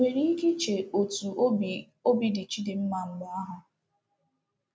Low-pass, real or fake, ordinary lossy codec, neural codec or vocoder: none; real; none; none